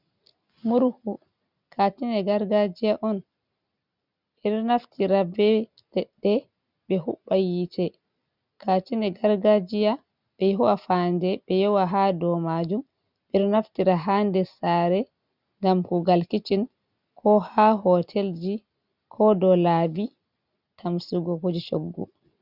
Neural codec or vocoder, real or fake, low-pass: none; real; 5.4 kHz